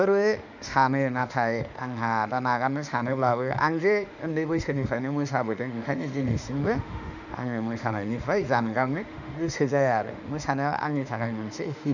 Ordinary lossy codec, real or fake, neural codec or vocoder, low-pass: none; fake; autoencoder, 48 kHz, 32 numbers a frame, DAC-VAE, trained on Japanese speech; 7.2 kHz